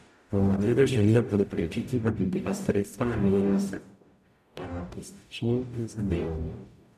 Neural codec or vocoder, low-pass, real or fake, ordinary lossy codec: codec, 44.1 kHz, 0.9 kbps, DAC; 14.4 kHz; fake; none